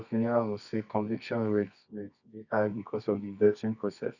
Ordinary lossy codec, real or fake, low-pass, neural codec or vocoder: none; fake; 7.2 kHz; codec, 24 kHz, 0.9 kbps, WavTokenizer, medium music audio release